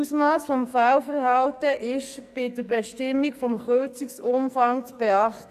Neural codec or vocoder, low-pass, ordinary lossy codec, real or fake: codec, 44.1 kHz, 2.6 kbps, SNAC; 14.4 kHz; AAC, 96 kbps; fake